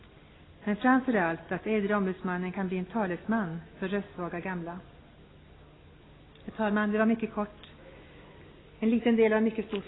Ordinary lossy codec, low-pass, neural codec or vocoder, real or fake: AAC, 16 kbps; 7.2 kHz; none; real